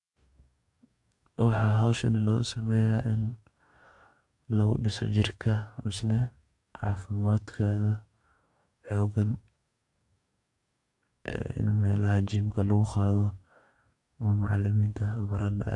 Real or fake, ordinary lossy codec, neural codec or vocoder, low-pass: fake; AAC, 64 kbps; codec, 44.1 kHz, 2.6 kbps, DAC; 10.8 kHz